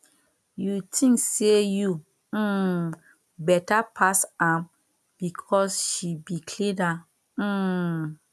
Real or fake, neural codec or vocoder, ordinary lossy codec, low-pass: real; none; none; none